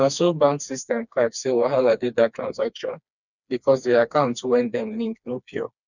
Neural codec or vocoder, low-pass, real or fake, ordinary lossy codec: codec, 16 kHz, 2 kbps, FreqCodec, smaller model; 7.2 kHz; fake; none